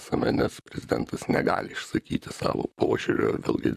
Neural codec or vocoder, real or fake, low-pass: vocoder, 44.1 kHz, 128 mel bands, Pupu-Vocoder; fake; 14.4 kHz